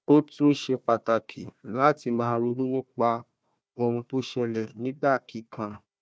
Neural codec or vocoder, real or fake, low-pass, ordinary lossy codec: codec, 16 kHz, 1 kbps, FunCodec, trained on Chinese and English, 50 frames a second; fake; none; none